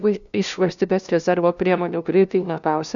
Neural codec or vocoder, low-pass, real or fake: codec, 16 kHz, 0.5 kbps, FunCodec, trained on LibriTTS, 25 frames a second; 7.2 kHz; fake